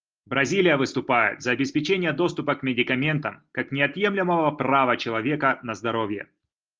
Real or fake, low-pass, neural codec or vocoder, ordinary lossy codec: real; 7.2 kHz; none; Opus, 24 kbps